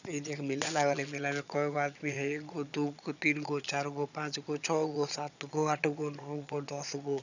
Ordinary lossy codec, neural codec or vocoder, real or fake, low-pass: none; vocoder, 22.05 kHz, 80 mel bands, Vocos; fake; 7.2 kHz